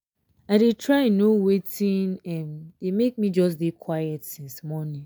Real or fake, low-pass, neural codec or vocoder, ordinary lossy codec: real; none; none; none